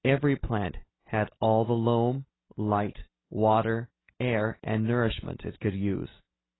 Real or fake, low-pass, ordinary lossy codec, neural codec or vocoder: real; 7.2 kHz; AAC, 16 kbps; none